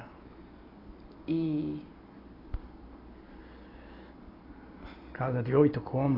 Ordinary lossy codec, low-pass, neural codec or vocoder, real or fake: AAC, 24 kbps; 5.4 kHz; none; real